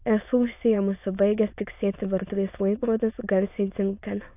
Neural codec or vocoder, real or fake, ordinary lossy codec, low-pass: autoencoder, 22.05 kHz, a latent of 192 numbers a frame, VITS, trained on many speakers; fake; AAC, 32 kbps; 3.6 kHz